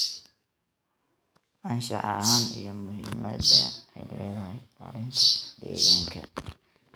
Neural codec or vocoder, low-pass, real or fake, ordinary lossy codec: codec, 44.1 kHz, 7.8 kbps, DAC; none; fake; none